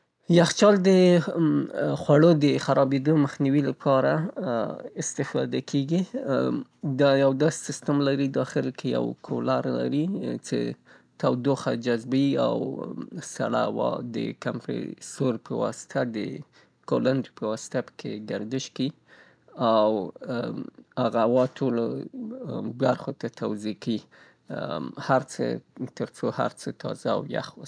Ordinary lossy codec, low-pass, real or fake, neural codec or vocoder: none; 9.9 kHz; real; none